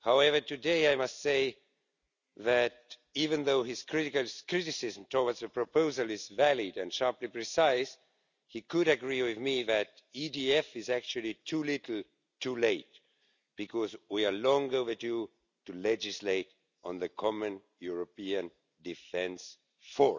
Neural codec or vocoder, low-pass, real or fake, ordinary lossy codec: none; 7.2 kHz; real; none